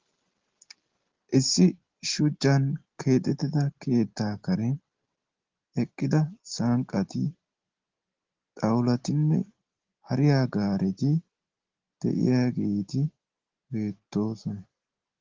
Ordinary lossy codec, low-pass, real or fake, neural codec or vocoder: Opus, 16 kbps; 7.2 kHz; real; none